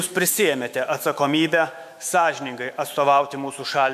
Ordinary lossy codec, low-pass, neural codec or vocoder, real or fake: MP3, 96 kbps; 14.4 kHz; autoencoder, 48 kHz, 128 numbers a frame, DAC-VAE, trained on Japanese speech; fake